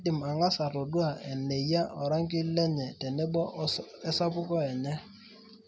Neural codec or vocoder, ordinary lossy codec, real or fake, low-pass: none; none; real; none